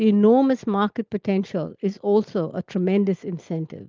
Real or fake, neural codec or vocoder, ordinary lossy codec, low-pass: real; none; Opus, 32 kbps; 7.2 kHz